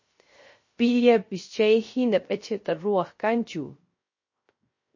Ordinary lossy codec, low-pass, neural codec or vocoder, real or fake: MP3, 32 kbps; 7.2 kHz; codec, 16 kHz, 0.3 kbps, FocalCodec; fake